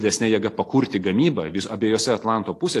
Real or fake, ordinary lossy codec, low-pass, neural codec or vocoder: real; AAC, 48 kbps; 14.4 kHz; none